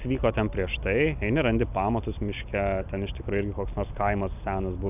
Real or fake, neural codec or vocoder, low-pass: real; none; 3.6 kHz